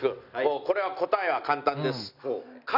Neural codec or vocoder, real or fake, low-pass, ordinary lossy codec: none; real; 5.4 kHz; none